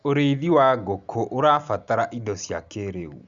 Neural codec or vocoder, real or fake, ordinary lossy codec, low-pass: none; real; Opus, 64 kbps; 7.2 kHz